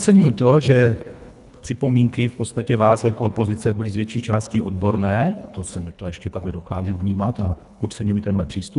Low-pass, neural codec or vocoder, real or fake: 10.8 kHz; codec, 24 kHz, 1.5 kbps, HILCodec; fake